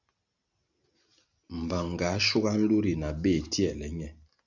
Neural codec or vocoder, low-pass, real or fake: none; 7.2 kHz; real